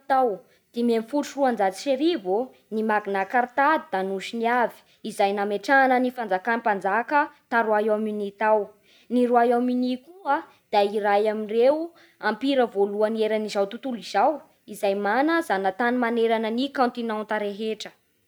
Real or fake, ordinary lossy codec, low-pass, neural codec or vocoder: real; none; none; none